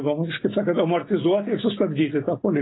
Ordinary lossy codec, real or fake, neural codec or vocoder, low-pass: AAC, 16 kbps; real; none; 7.2 kHz